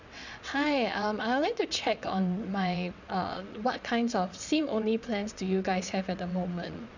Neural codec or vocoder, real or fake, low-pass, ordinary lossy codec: vocoder, 22.05 kHz, 80 mel bands, WaveNeXt; fake; 7.2 kHz; none